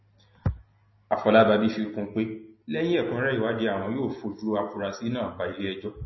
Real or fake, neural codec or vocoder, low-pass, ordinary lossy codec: real; none; 7.2 kHz; MP3, 24 kbps